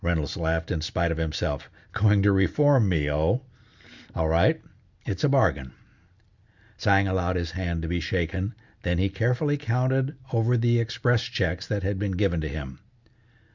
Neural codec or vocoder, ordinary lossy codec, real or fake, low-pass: none; Opus, 64 kbps; real; 7.2 kHz